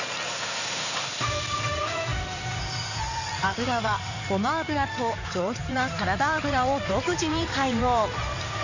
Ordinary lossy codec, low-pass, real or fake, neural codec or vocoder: none; 7.2 kHz; fake; codec, 16 kHz in and 24 kHz out, 2.2 kbps, FireRedTTS-2 codec